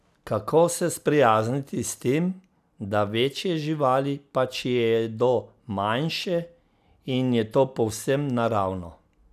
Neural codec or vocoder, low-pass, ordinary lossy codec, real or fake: none; 14.4 kHz; none; real